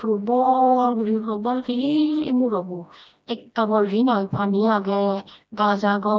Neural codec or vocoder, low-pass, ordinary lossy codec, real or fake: codec, 16 kHz, 1 kbps, FreqCodec, smaller model; none; none; fake